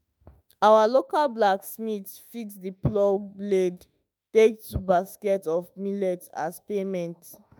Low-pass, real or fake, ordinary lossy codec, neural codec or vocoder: none; fake; none; autoencoder, 48 kHz, 32 numbers a frame, DAC-VAE, trained on Japanese speech